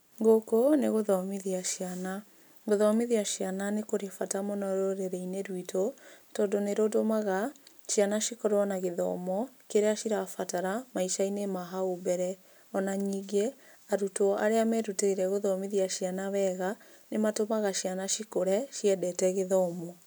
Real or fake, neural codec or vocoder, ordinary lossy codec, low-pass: real; none; none; none